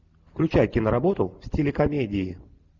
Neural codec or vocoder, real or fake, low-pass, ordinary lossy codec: none; real; 7.2 kHz; Opus, 64 kbps